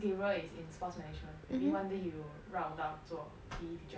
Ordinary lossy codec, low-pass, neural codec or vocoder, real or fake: none; none; none; real